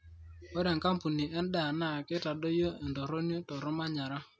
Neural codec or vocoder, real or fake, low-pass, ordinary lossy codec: none; real; none; none